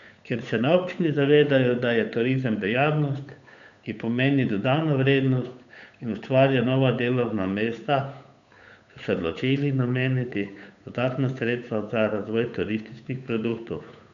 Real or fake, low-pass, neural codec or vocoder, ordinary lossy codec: fake; 7.2 kHz; codec, 16 kHz, 8 kbps, FunCodec, trained on Chinese and English, 25 frames a second; none